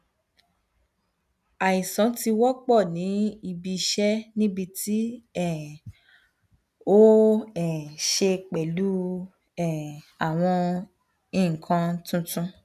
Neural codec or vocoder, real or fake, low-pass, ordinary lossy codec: none; real; 14.4 kHz; none